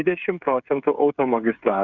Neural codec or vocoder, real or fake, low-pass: codec, 16 kHz, 8 kbps, FreqCodec, smaller model; fake; 7.2 kHz